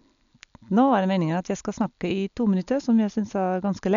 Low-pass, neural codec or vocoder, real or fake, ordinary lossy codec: 7.2 kHz; none; real; none